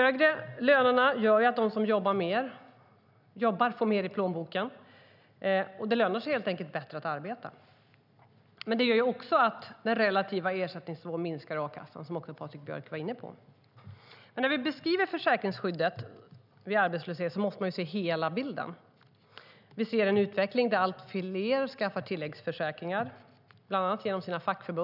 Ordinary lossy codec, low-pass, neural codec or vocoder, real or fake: none; 5.4 kHz; none; real